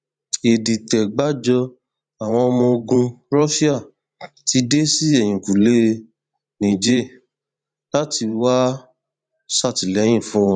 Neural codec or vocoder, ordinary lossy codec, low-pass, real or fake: vocoder, 44.1 kHz, 128 mel bands every 512 samples, BigVGAN v2; none; 9.9 kHz; fake